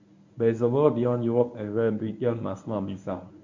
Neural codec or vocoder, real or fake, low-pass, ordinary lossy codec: codec, 24 kHz, 0.9 kbps, WavTokenizer, medium speech release version 1; fake; 7.2 kHz; MP3, 64 kbps